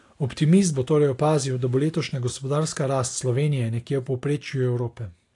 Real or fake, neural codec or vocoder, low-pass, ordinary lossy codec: real; none; 10.8 kHz; AAC, 48 kbps